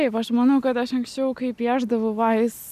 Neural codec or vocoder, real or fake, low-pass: none; real; 14.4 kHz